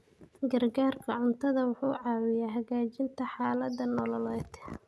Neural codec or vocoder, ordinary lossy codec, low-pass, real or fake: none; none; none; real